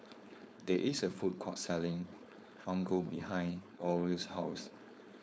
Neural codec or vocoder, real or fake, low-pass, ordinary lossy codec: codec, 16 kHz, 4.8 kbps, FACodec; fake; none; none